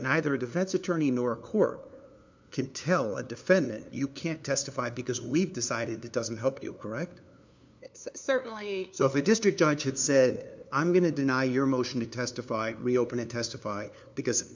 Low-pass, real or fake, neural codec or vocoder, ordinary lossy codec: 7.2 kHz; fake; codec, 16 kHz, 2 kbps, FunCodec, trained on LibriTTS, 25 frames a second; MP3, 64 kbps